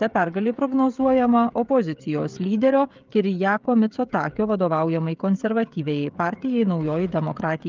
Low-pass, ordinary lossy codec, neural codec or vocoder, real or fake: 7.2 kHz; Opus, 32 kbps; codec, 16 kHz, 16 kbps, FreqCodec, smaller model; fake